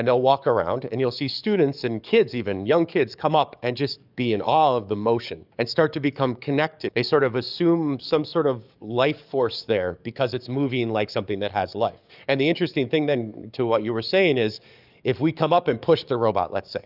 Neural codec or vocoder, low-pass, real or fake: codec, 44.1 kHz, 7.8 kbps, DAC; 5.4 kHz; fake